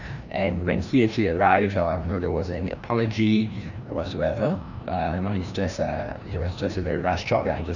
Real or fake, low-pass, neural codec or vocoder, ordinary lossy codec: fake; 7.2 kHz; codec, 16 kHz, 1 kbps, FreqCodec, larger model; none